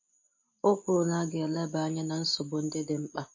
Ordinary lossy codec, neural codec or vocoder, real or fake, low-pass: MP3, 32 kbps; none; real; 7.2 kHz